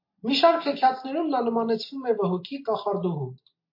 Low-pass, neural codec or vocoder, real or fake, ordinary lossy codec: 5.4 kHz; none; real; MP3, 32 kbps